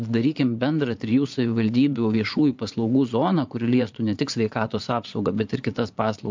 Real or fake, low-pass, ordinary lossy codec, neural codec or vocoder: fake; 7.2 kHz; MP3, 64 kbps; vocoder, 22.05 kHz, 80 mel bands, Vocos